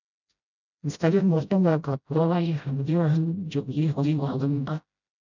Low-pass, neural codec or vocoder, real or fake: 7.2 kHz; codec, 16 kHz, 0.5 kbps, FreqCodec, smaller model; fake